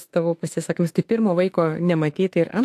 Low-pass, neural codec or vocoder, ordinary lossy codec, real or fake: 14.4 kHz; autoencoder, 48 kHz, 32 numbers a frame, DAC-VAE, trained on Japanese speech; AAC, 64 kbps; fake